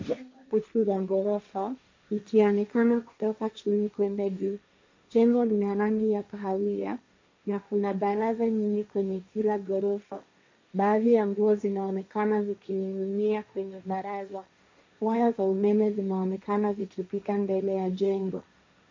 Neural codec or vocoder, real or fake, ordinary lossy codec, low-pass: codec, 16 kHz, 1.1 kbps, Voila-Tokenizer; fake; MP3, 48 kbps; 7.2 kHz